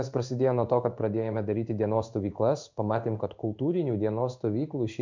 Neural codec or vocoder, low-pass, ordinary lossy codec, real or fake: codec, 16 kHz in and 24 kHz out, 1 kbps, XY-Tokenizer; 7.2 kHz; MP3, 64 kbps; fake